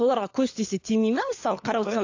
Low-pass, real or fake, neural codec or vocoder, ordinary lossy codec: 7.2 kHz; fake; codec, 16 kHz, 4 kbps, FunCodec, trained on LibriTTS, 50 frames a second; AAC, 48 kbps